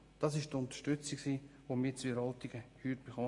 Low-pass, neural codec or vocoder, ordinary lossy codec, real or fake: 10.8 kHz; none; MP3, 48 kbps; real